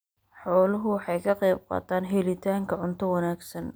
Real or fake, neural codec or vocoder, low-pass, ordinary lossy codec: real; none; none; none